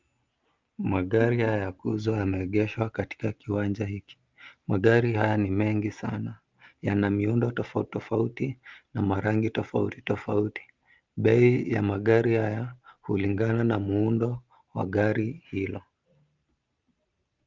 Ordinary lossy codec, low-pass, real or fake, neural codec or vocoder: Opus, 24 kbps; 7.2 kHz; real; none